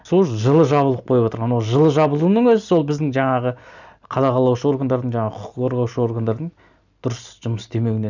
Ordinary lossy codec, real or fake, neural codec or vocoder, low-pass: none; real; none; 7.2 kHz